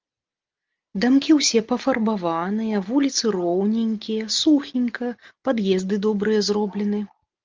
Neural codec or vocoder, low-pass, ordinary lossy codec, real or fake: none; 7.2 kHz; Opus, 32 kbps; real